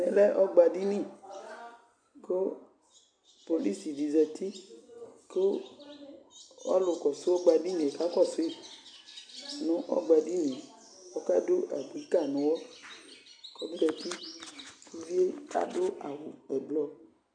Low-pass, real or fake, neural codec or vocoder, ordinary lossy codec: 9.9 kHz; real; none; AAC, 64 kbps